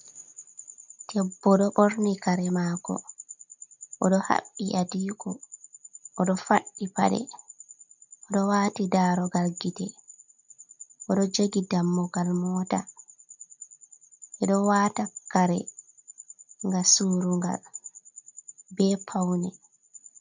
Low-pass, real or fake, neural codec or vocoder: 7.2 kHz; real; none